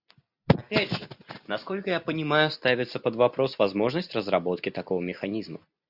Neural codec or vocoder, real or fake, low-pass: none; real; 5.4 kHz